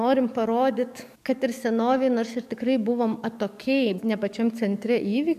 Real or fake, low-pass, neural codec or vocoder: fake; 14.4 kHz; codec, 44.1 kHz, 7.8 kbps, DAC